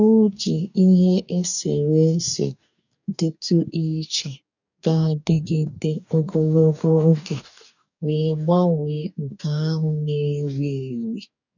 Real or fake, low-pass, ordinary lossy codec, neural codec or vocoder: fake; 7.2 kHz; none; codec, 44.1 kHz, 2.6 kbps, DAC